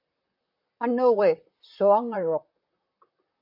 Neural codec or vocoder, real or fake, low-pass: vocoder, 44.1 kHz, 128 mel bands, Pupu-Vocoder; fake; 5.4 kHz